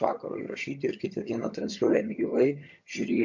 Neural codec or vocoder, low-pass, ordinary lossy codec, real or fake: vocoder, 22.05 kHz, 80 mel bands, HiFi-GAN; 7.2 kHz; MP3, 48 kbps; fake